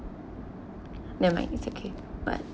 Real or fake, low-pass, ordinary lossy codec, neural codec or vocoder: real; none; none; none